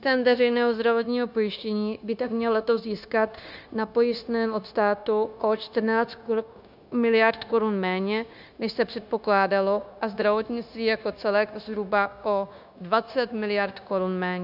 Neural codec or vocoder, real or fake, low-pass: codec, 16 kHz, 0.9 kbps, LongCat-Audio-Codec; fake; 5.4 kHz